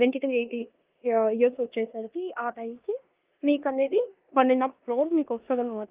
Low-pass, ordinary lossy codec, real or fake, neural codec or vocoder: 3.6 kHz; Opus, 24 kbps; fake; codec, 16 kHz in and 24 kHz out, 0.9 kbps, LongCat-Audio-Codec, four codebook decoder